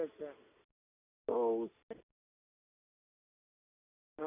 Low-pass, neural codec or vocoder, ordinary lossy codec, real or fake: 3.6 kHz; none; none; real